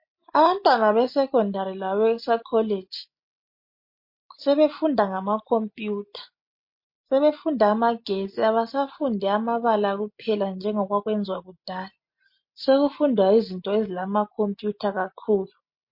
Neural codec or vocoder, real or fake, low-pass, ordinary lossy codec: none; real; 5.4 kHz; MP3, 32 kbps